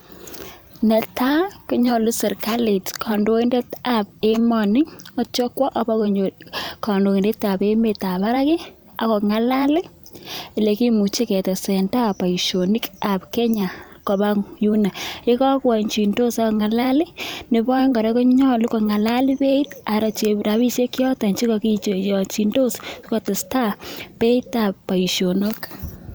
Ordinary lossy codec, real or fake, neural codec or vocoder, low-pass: none; fake; vocoder, 44.1 kHz, 128 mel bands every 512 samples, BigVGAN v2; none